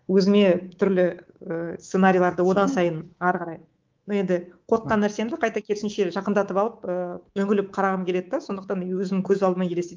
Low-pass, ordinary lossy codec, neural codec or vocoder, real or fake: 7.2 kHz; Opus, 24 kbps; codec, 24 kHz, 3.1 kbps, DualCodec; fake